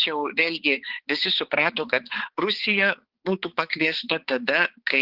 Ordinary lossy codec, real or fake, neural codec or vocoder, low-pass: Opus, 16 kbps; fake; codec, 16 kHz, 4 kbps, X-Codec, HuBERT features, trained on balanced general audio; 5.4 kHz